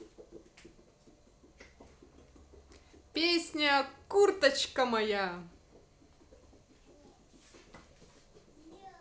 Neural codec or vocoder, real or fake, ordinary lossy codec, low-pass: none; real; none; none